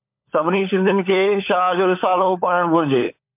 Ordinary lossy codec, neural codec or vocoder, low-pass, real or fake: MP3, 32 kbps; codec, 16 kHz, 16 kbps, FunCodec, trained on LibriTTS, 50 frames a second; 3.6 kHz; fake